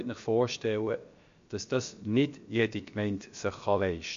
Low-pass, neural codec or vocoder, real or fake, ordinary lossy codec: 7.2 kHz; codec, 16 kHz, about 1 kbps, DyCAST, with the encoder's durations; fake; MP3, 48 kbps